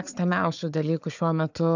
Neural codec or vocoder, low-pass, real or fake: codec, 16 kHz, 4 kbps, FunCodec, trained on Chinese and English, 50 frames a second; 7.2 kHz; fake